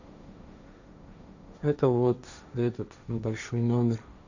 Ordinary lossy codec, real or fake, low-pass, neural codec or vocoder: none; fake; 7.2 kHz; codec, 16 kHz, 1.1 kbps, Voila-Tokenizer